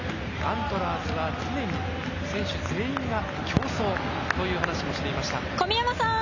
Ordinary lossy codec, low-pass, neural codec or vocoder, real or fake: none; 7.2 kHz; none; real